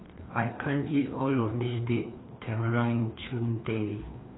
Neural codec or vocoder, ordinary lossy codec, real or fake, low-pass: codec, 16 kHz, 2 kbps, FreqCodec, larger model; AAC, 16 kbps; fake; 7.2 kHz